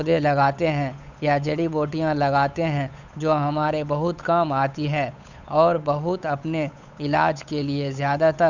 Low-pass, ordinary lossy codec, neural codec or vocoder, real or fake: 7.2 kHz; none; codec, 16 kHz, 8 kbps, FunCodec, trained on Chinese and English, 25 frames a second; fake